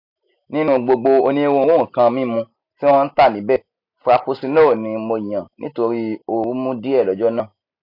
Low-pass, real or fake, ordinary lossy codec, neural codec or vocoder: 5.4 kHz; real; none; none